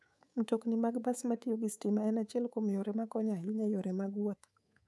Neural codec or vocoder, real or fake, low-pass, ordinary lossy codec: codec, 24 kHz, 3.1 kbps, DualCodec; fake; none; none